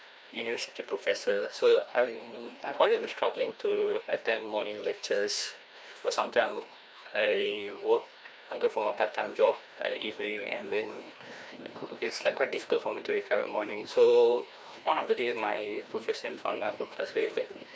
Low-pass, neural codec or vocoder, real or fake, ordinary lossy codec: none; codec, 16 kHz, 1 kbps, FreqCodec, larger model; fake; none